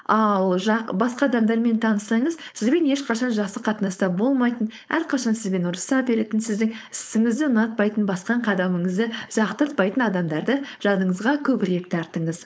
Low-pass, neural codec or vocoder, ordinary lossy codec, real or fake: none; codec, 16 kHz, 4.8 kbps, FACodec; none; fake